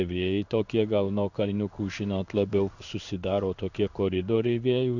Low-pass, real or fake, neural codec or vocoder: 7.2 kHz; fake; codec, 16 kHz in and 24 kHz out, 1 kbps, XY-Tokenizer